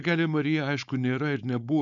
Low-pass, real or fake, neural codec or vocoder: 7.2 kHz; fake; codec, 16 kHz, 4.8 kbps, FACodec